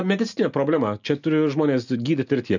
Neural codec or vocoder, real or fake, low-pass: none; real; 7.2 kHz